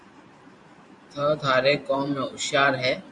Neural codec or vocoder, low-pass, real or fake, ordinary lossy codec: vocoder, 44.1 kHz, 128 mel bands every 512 samples, BigVGAN v2; 10.8 kHz; fake; AAC, 64 kbps